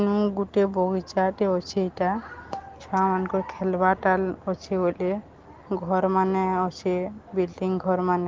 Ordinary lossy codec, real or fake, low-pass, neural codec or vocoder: Opus, 16 kbps; real; 7.2 kHz; none